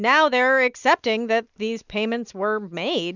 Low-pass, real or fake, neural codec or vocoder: 7.2 kHz; real; none